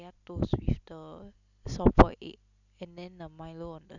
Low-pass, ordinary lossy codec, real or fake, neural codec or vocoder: 7.2 kHz; none; real; none